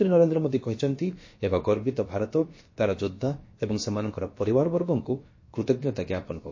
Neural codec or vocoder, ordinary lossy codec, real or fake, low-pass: codec, 16 kHz, about 1 kbps, DyCAST, with the encoder's durations; MP3, 32 kbps; fake; 7.2 kHz